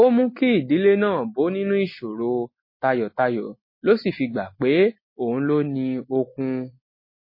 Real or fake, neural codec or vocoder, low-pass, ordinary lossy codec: real; none; 5.4 kHz; MP3, 24 kbps